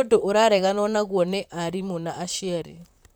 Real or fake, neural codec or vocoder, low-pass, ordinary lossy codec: fake; vocoder, 44.1 kHz, 128 mel bands, Pupu-Vocoder; none; none